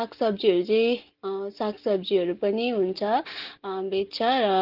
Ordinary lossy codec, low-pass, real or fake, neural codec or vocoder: Opus, 16 kbps; 5.4 kHz; real; none